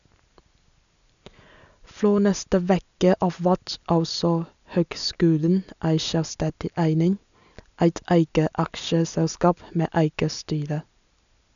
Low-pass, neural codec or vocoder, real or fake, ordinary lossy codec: 7.2 kHz; none; real; none